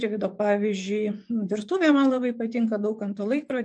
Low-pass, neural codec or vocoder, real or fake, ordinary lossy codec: 10.8 kHz; vocoder, 24 kHz, 100 mel bands, Vocos; fake; MP3, 96 kbps